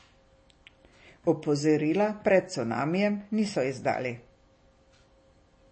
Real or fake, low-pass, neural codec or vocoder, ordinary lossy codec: real; 9.9 kHz; none; MP3, 32 kbps